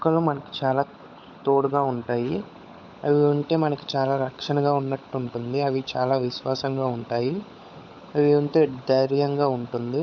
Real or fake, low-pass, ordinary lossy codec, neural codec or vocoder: fake; none; none; codec, 16 kHz, 16 kbps, FunCodec, trained on LibriTTS, 50 frames a second